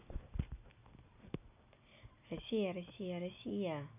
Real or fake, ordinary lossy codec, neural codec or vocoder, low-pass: real; none; none; 3.6 kHz